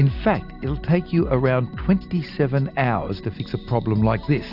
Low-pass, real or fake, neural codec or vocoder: 5.4 kHz; real; none